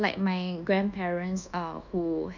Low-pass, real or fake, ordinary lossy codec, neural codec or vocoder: 7.2 kHz; fake; none; codec, 24 kHz, 1.2 kbps, DualCodec